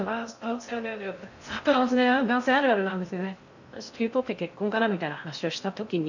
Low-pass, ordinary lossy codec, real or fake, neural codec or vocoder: 7.2 kHz; none; fake; codec, 16 kHz in and 24 kHz out, 0.6 kbps, FocalCodec, streaming, 4096 codes